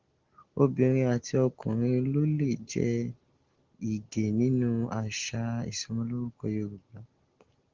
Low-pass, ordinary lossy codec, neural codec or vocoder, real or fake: 7.2 kHz; Opus, 16 kbps; none; real